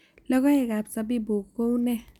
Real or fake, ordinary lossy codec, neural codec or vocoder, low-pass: real; none; none; 19.8 kHz